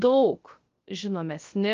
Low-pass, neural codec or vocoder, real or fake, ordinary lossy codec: 7.2 kHz; codec, 16 kHz, 0.3 kbps, FocalCodec; fake; Opus, 32 kbps